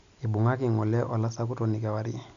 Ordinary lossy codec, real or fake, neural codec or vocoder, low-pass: none; real; none; 7.2 kHz